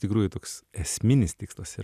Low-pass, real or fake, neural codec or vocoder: 14.4 kHz; real; none